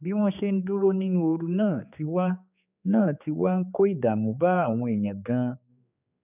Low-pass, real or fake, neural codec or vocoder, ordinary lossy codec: 3.6 kHz; fake; codec, 16 kHz, 4 kbps, X-Codec, HuBERT features, trained on general audio; none